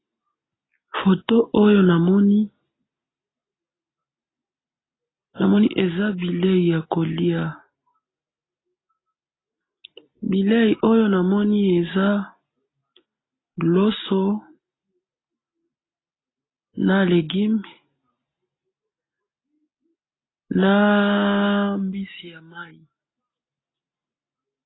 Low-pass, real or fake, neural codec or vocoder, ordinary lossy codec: 7.2 kHz; real; none; AAC, 16 kbps